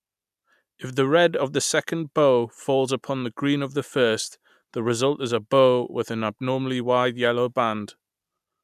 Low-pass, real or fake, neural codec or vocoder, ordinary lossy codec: 14.4 kHz; real; none; none